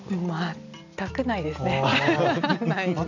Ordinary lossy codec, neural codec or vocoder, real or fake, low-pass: none; none; real; 7.2 kHz